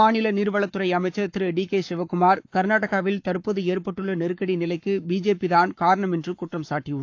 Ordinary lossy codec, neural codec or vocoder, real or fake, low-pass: AAC, 48 kbps; codec, 44.1 kHz, 7.8 kbps, Pupu-Codec; fake; 7.2 kHz